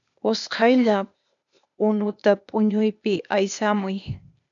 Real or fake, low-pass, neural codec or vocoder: fake; 7.2 kHz; codec, 16 kHz, 0.8 kbps, ZipCodec